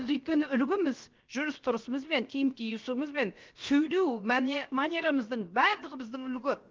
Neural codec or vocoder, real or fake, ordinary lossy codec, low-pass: codec, 16 kHz, about 1 kbps, DyCAST, with the encoder's durations; fake; Opus, 32 kbps; 7.2 kHz